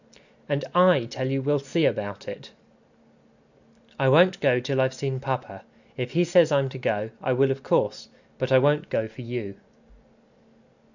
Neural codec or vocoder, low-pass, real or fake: none; 7.2 kHz; real